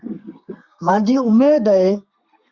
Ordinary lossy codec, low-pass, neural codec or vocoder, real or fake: Opus, 64 kbps; 7.2 kHz; codec, 32 kHz, 1.9 kbps, SNAC; fake